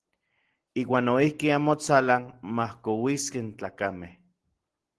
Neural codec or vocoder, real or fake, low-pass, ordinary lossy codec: none; real; 10.8 kHz; Opus, 16 kbps